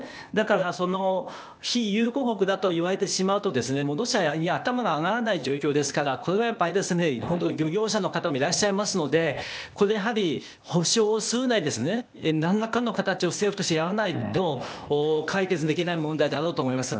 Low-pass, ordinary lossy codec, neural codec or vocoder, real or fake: none; none; codec, 16 kHz, 0.8 kbps, ZipCodec; fake